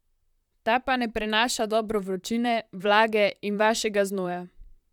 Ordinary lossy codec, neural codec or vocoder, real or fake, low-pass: none; vocoder, 44.1 kHz, 128 mel bands, Pupu-Vocoder; fake; 19.8 kHz